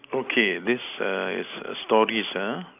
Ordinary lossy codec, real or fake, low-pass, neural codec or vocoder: AAC, 32 kbps; real; 3.6 kHz; none